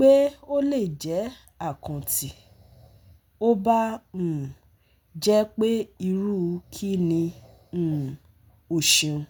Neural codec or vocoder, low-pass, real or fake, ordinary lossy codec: none; none; real; none